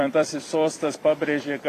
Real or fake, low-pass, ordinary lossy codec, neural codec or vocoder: fake; 14.4 kHz; AAC, 48 kbps; vocoder, 44.1 kHz, 128 mel bands every 256 samples, BigVGAN v2